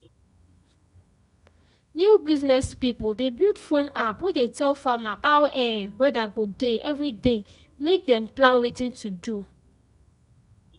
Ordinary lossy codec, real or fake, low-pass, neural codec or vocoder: none; fake; 10.8 kHz; codec, 24 kHz, 0.9 kbps, WavTokenizer, medium music audio release